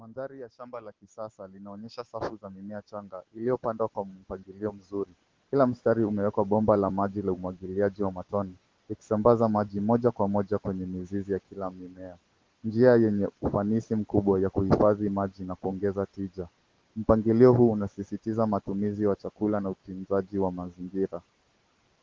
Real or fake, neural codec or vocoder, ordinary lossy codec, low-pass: real; none; Opus, 16 kbps; 7.2 kHz